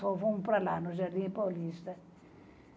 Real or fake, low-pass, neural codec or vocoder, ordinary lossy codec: real; none; none; none